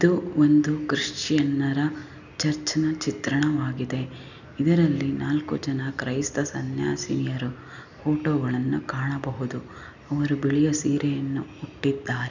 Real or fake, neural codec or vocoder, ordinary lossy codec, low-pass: real; none; none; 7.2 kHz